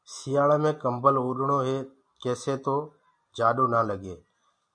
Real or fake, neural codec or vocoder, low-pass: real; none; 9.9 kHz